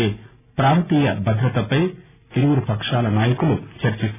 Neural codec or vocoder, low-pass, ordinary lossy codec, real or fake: none; 3.6 kHz; none; real